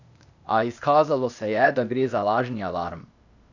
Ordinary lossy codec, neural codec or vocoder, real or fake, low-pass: none; codec, 16 kHz, 0.8 kbps, ZipCodec; fake; 7.2 kHz